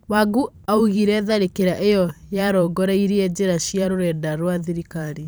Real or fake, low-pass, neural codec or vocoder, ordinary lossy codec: fake; none; vocoder, 44.1 kHz, 128 mel bands every 512 samples, BigVGAN v2; none